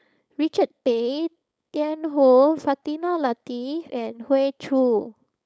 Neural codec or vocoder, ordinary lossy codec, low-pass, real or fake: codec, 16 kHz, 4.8 kbps, FACodec; none; none; fake